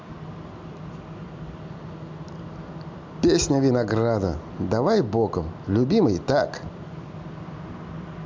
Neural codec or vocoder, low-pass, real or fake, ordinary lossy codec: none; 7.2 kHz; real; MP3, 64 kbps